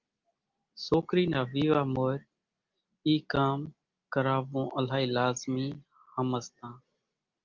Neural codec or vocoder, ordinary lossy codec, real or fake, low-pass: none; Opus, 32 kbps; real; 7.2 kHz